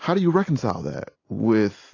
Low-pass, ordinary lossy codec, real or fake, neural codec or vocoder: 7.2 kHz; AAC, 32 kbps; real; none